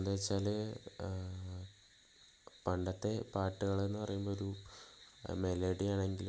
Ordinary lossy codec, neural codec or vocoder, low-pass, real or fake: none; none; none; real